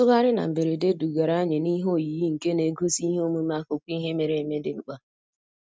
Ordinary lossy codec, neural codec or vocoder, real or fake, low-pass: none; none; real; none